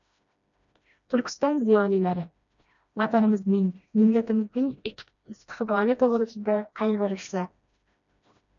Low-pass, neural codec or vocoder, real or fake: 7.2 kHz; codec, 16 kHz, 1 kbps, FreqCodec, smaller model; fake